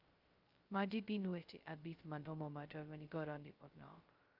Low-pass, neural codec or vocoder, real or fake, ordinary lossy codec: 5.4 kHz; codec, 16 kHz, 0.2 kbps, FocalCodec; fake; Opus, 24 kbps